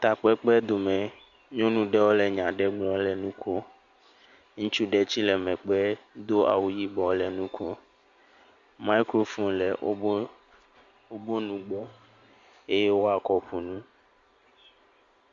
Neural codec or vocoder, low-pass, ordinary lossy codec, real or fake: none; 7.2 kHz; Opus, 64 kbps; real